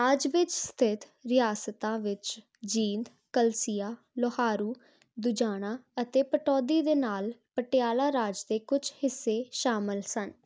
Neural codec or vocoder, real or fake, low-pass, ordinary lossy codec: none; real; none; none